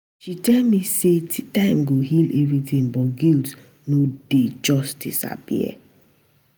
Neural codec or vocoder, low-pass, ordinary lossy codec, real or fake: none; none; none; real